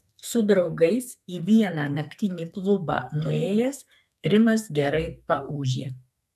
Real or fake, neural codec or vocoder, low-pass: fake; codec, 32 kHz, 1.9 kbps, SNAC; 14.4 kHz